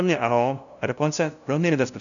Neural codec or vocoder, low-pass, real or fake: codec, 16 kHz, 0.5 kbps, FunCodec, trained on LibriTTS, 25 frames a second; 7.2 kHz; fake